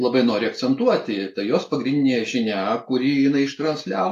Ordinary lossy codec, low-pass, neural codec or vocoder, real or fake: AAC, 64 kbps; 14.4 kHz; none; real